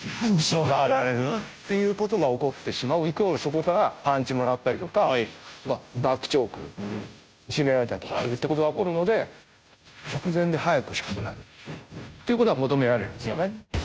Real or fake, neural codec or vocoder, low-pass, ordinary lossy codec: fake; codec, 16 kHz, 0.5 kbps, FunCodec, trained on Chinese and English, 25 frames a second; none; none